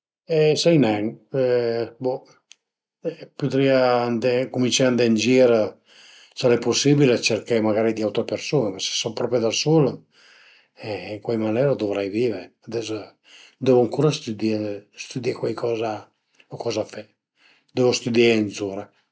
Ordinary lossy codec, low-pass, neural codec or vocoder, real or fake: none; none; none; real